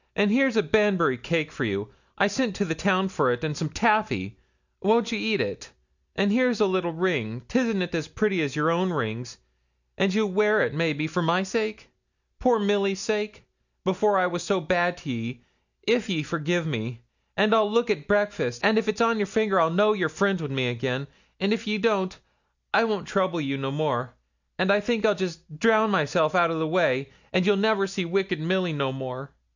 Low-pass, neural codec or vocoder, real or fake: 7.2 kHz; none; real